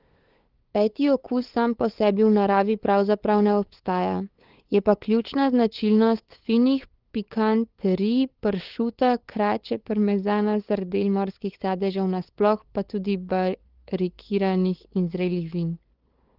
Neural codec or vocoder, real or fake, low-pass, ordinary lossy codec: codec, 16 kHz, 8 kbps, FunCodec, trained on LibriTTS, 25 frames a second; fake; 5.4 kHz; Opus, 16 kbps